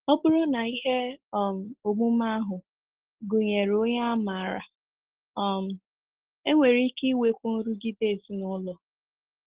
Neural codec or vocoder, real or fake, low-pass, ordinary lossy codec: none; real; 3.6 kHz; Opus, 16 kbps